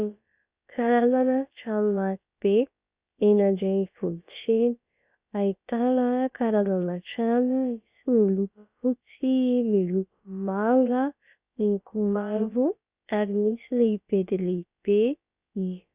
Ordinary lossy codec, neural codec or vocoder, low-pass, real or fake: Opus, 64 kbps; codec, 16 kHz, about 1 kbps, DyCAST, with the encoder's durations; 3.6 kHz; fake